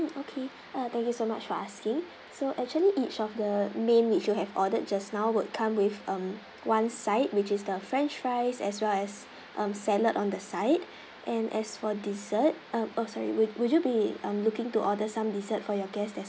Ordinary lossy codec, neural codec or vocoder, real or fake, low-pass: none; none; real; none